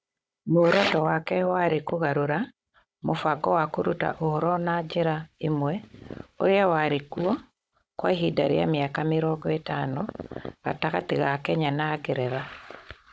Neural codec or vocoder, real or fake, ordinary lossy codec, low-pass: codec, 16 kHz, 16 kbps, FunCodec, trained on Chinese and English, 50 frames a second; fake; none; none